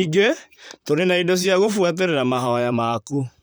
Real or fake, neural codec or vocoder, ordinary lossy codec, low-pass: fake; vocoder, 44.1 kHz, 128 mel bands, Pupu-Vocoder; none; none